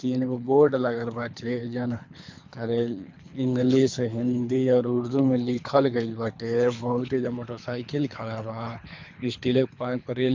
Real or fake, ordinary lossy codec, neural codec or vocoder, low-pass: fake; MP3, 64 kbps; codec, 24 kHz, 3 kbps, HILCodec; 7.2 kHz